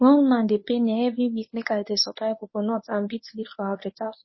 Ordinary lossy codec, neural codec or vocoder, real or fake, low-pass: MP3, 24 kbps; codec, 24 kHz, 0.9 kbps, WavTokenizer, medium speech release version 1; fake; 7.2 kHz